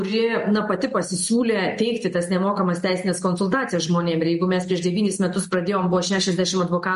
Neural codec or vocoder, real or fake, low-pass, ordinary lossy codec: none; real; 14.4 kHz; MP3, 48 kbps